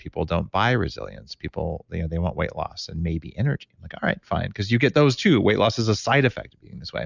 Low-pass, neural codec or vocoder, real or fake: 7.2 kHz; none; real